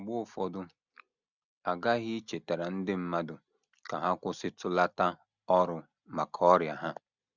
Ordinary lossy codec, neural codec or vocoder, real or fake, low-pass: none; none; real; 7.2 kHz